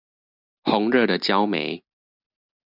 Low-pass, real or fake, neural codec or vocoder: 5.4 kHz; real; none